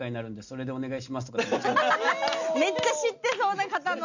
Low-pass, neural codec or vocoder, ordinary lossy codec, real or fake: 7.2 kHz; none; none; real